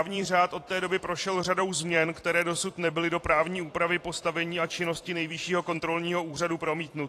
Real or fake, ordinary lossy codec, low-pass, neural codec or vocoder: fake; AAC, 48 kbps; 14.4 kHz; vocoder, 44.1 kHz, 128 mel bands every 256 samples, BigVGAN v2